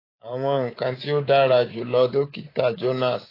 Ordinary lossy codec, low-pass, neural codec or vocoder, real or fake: AAC, 24 kbps; 5.4 kHz; codec, 24 kHz, 3.1 kbps, DualCodec; fake